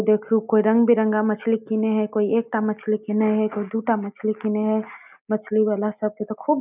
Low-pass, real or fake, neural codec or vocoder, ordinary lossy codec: 3.6 kHz; real; none; none